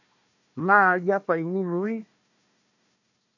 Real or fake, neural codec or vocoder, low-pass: fake; codec, 16 kHz, 1 kbps, FunCodec, trained on Chinese and English, 50 frames a second; 7.2 kHz